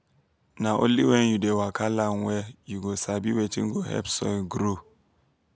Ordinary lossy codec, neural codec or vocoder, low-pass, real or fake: none; none; none; real